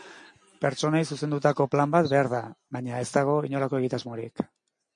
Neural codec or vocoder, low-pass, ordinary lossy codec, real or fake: none; 9.9 kHz; MP3, 48 kbps; real